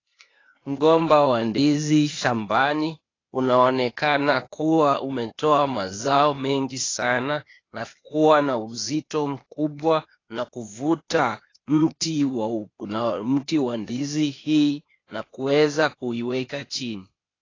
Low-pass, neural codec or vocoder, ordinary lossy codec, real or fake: 7.2 kHz; codec, 16 kHz, 0.8 kbps, ZipCodec; AAC, 32 kbps; fake